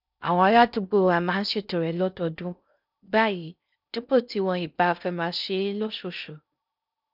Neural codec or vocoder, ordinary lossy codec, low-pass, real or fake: codec, 16 kHz in and 24 kHz out, 0.6 kbps, FocalCodec, streaming, 4096 codes; none; 5.4 kHz; fake